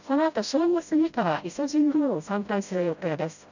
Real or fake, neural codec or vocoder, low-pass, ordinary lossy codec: fake; codec, 16 kHz, 0.5 kbps, FreqCodec, smaller model; 7.2 kHz; none